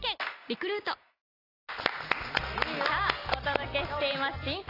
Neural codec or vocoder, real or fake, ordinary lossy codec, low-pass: none; real; AAC, 24 kbps; 5.4 kHz